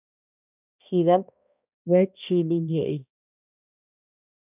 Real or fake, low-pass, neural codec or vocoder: fake; 3.6 kHz; codec, 16 kHz, 1 kbps, X-Codec, HuBERT features, trained on balanced general audio